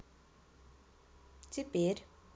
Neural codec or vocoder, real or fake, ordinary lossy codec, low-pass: none; real; none; none